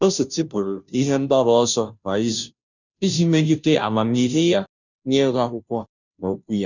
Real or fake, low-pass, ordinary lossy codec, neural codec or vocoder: fake; 7.2 kHz; none; codec, 16 kHz, 0.5 kbps, FunCodec, trained on Chinese and English, 25 frames a second